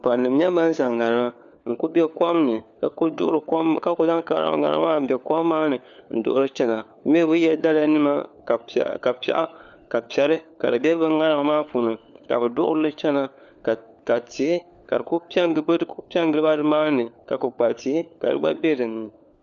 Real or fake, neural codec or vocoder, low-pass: fake; codec, 16 kHz, 4 kbps, FunCodec, trained on LibriTTS, 50 frames a second; 7.2 kHz